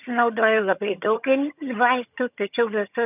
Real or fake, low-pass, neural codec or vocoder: fake; 3.6 kHz; vocoder, 22.05 kHz, 80 mel bands, HiFi-GAN